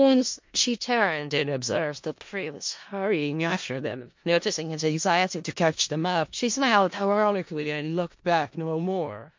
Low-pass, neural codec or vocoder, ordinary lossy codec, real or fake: 7.2 kHz; codec, 16 kHz in and 24 kHz out, 0.4 kbps, LongCat-Audio-Codec, four codebook decoder; MP3, 48 kbps; fake